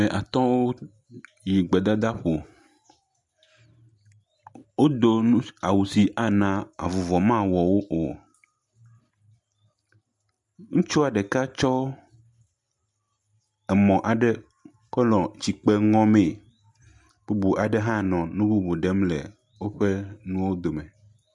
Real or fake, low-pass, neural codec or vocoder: real; 10.8 kHz; none